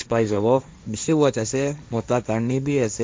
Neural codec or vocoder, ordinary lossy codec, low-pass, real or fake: codec, 16 kHz, 1.1 kbps, Voila-Tokenizer; none; none; fake